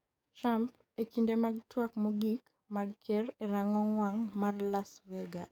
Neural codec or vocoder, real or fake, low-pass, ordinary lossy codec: codec, 44.1 kHz, 7.8 kbps, DAC; fake; 19.8 kHz; none